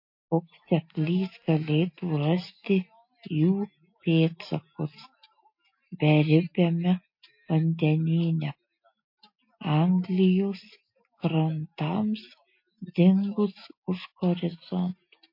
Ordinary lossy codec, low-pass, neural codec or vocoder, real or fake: MP3, 32 kbps; 5.4 kHz; none; real